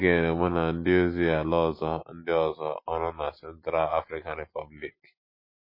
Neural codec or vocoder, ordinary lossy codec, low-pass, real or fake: none; MP3, 24 kbps; 5.4 kHz; real